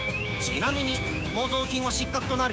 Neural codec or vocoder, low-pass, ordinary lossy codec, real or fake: codec, 16 kHz, 6 kbps, DAC; none; none; fake